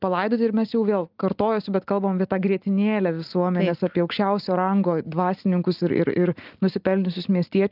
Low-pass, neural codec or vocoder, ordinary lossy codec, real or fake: 5.4 kHz; none; Opus, 24 kbps; real